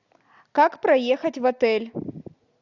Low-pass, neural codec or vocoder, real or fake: 7.2 kHz; none; real